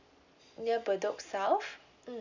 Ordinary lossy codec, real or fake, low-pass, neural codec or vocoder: none; real; 7.2 kHz; none